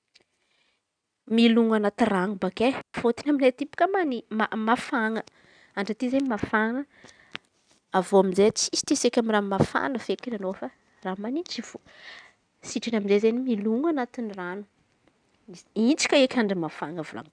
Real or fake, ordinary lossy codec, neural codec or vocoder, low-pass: real; none; none; 9.9 kHz